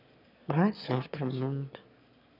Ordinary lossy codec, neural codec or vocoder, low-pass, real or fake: none; autoencoder, 22.05 kHz, a latent of 192 numbers a frame, VITS, trained on one speaker; 5.4 kHz; fake